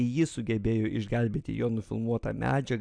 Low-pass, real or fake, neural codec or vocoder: 9.9 kHz; real; none